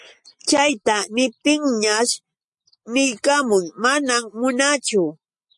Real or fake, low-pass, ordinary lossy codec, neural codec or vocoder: real; 10.8 kHz; MP3, 48 kbps; none